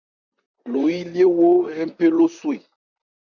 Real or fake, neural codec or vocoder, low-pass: fake; vocoder, 44.1 kHz, 128 mel bands, Pupu-Vocoder; 7.2 kHz